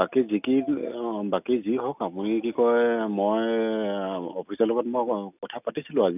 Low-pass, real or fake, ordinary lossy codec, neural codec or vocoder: 3.6 kHz; real; none; none